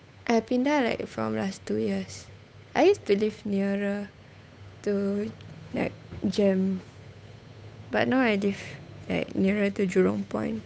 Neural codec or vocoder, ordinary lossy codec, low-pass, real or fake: codec, 16 kHz, 8 kbps, FunCodec, trained on Chinese and English, 25 frames a second; none; none; fake